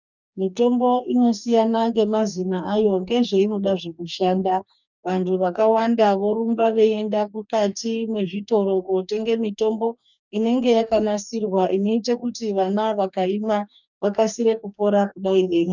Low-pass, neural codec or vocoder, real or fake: 7.2 kHz; codec, 44.1 kHz, 2.6 kbps, DAC; fake